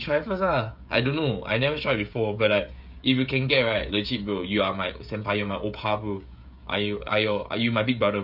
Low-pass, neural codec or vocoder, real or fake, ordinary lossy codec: 5.4 kHz; codec, 16 kHz, 16 kbps, FreqCodec, smaller model; fake; none